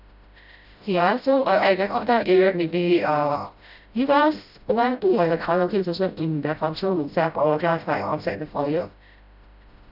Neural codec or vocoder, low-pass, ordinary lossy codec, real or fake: codec, 16 kHz, 0.5 kbps, FreqCodec, smaller model; 5.4 kHz; Opus, 64 kbps; fake